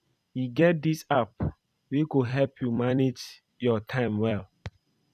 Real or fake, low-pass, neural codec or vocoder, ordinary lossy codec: fake; 14.4 kHz; vocoder, 44.1 kHz, 128 mel bands every 256 samples, BigVGAN v2; none